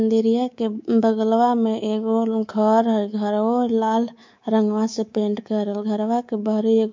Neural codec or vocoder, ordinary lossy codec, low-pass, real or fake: codec, 24 kHz, 3.1 kbps, DualCodec; MP3, 48 kbps; 7.2 kHz; fake